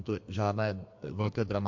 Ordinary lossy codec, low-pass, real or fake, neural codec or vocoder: MP3, 48 kbps; 7.2 kHz; fake; codec, 32 kHz, 1.9 kbps, SNAC